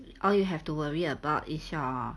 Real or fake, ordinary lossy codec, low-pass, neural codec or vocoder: real; none; none; none